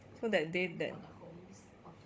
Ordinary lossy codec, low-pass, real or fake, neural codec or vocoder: none; none; fake; codec, 16 kHz, 16 kbps, FunCodec, trained on Chinese and English, 50 frames a second